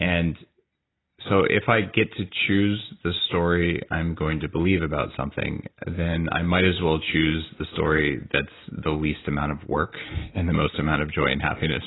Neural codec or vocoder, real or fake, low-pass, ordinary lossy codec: none; real; 7.2 kHz; AAC, 16 kbps